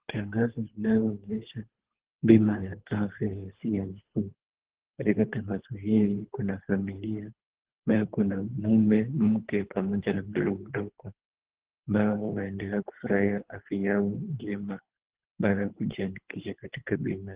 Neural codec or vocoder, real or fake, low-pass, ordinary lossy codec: codec, 24 kHz, 3 kbps, HILCodec; fake; 3.6 kHz; Opus, 16 kbps